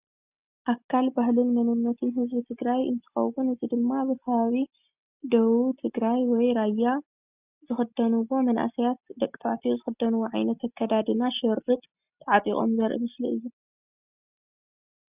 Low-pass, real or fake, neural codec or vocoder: 3.6 kHz; real; none